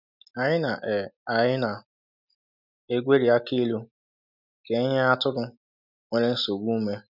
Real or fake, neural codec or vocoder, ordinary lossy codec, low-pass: real; none; none; 5.4 kHz